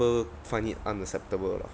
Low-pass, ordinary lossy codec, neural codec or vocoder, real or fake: none; none; none; real